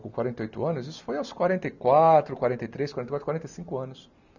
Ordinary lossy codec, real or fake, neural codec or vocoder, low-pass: none; real; none; 7.2 kHz